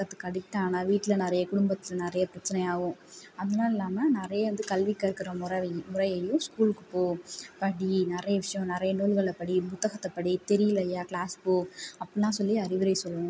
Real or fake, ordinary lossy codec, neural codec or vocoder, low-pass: real; none; none; none